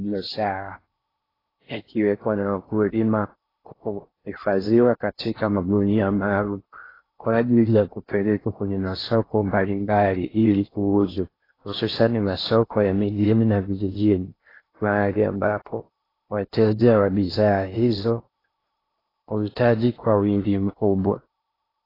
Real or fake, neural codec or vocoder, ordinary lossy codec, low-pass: fake; codec, 16 kHz in and 24 kHz out, 0.6 kbps, FocalCodec, streaming, 4096 codes; AAC, 24 kbps; 5.4 kHz